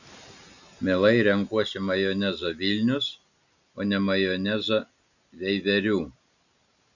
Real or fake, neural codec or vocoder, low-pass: real; none; 7.2 kHz